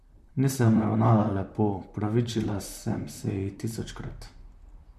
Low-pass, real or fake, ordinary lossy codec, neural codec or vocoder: 14.4 kHz; fake; MP3, 64 kbps; vocoder, 44.1 kHz, 128 mel bands, Pupu-Vocoder